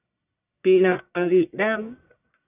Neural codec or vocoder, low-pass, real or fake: codec, 44.1 kHz, 1.7 kbps, Pupu-Codec; 3.6 kHz; fake